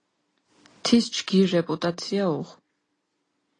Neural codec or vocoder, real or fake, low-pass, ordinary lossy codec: none; real; 9.9 kHz; AAC, 32 kbps